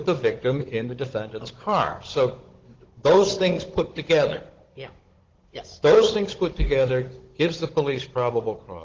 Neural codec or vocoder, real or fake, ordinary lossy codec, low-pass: codec, 16 kHz, 16 kbps, FunCodec, trained on Chinese and English, 50 frames a second; fake; Opus, 16 kbps; 7.2 kHz